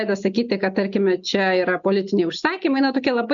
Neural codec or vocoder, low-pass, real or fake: none; 7.2 kHz; real